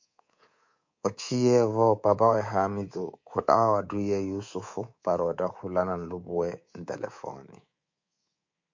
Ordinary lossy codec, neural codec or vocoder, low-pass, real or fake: MP3, 48 kbps; codec, 24 kHz, 3.1 kbps, DualCodec; 7.2 kHz; fake